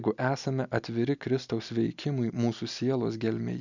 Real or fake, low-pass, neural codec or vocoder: real; 7.2 kHz; none